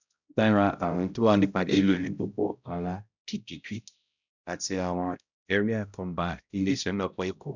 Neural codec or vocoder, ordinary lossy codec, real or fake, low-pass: codec, 16 kHz, 0.5 kbps, X-Codec, HuBERT features, trained on balanced general audio; none; fake; 7.2 kHz